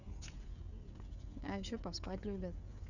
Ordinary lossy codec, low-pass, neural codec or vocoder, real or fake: none; 7.2 kHz; codec, 16 kHz, 16 kbps, FreqCodec, smaller model; fake